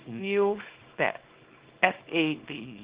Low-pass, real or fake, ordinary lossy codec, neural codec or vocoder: 3.6 kHz; fake; Opus, 16 kbps; codec, 24 kHz, 0.9 kbps, WavTokenizer, small release